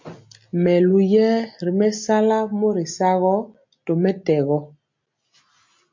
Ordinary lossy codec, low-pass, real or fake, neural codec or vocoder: MP3, 48 kbps; 7.2 kHz; real; none